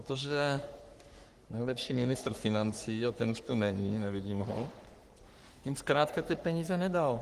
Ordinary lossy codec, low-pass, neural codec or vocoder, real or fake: Opus, 24 kbps; 14.4 kHz; codec, 44.1 kHz, 3.4 kbps, Pupu-Codec; fake